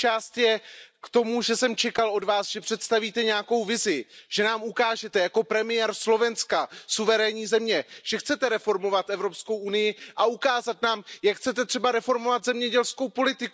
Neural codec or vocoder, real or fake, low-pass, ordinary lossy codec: none; real; none; none